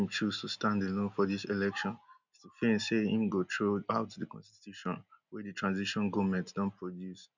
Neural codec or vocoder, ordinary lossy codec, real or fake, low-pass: none; none; real; 7.2 kHz